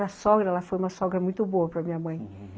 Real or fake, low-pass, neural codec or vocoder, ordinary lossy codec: real; none; none; none